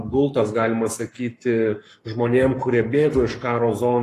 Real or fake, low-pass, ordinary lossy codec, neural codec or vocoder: fake; 14.4 kHz; AAC, 48 kbps; codec, 44.1 kHz, 7.8 kbps, Pupu-Codec